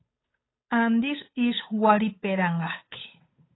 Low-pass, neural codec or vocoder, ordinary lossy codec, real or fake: 7.2 kHz; codec, 16 kHz, 8 kbps, FunCodec, trained on Chinese and English, 25 frames a second; AAC, 16 kbps; fake